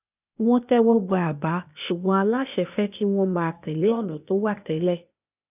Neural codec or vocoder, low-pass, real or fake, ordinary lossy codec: codec, 16 kHz, 0.8 kbps, ZipCodec; 3.6 kHz; fake; none